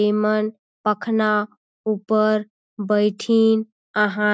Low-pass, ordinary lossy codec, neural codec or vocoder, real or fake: none; none; none; real